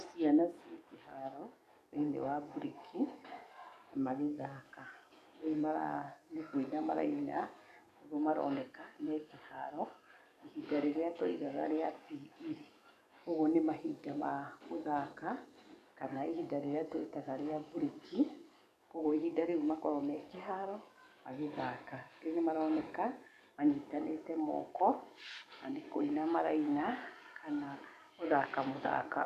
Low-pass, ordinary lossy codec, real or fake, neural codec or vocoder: 14.4 kHz; none; fake; codec, 44.1 kHz, 7.8 kbps, DAC